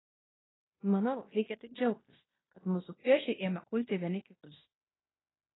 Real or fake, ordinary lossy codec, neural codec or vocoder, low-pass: fake; AAC, 16 kbps; codec, 16 kHz in and 24 kHz out, 0.9 kbps, LongCat-Audio-Codec, fine tuned four codebook decoder; 7.2 kHz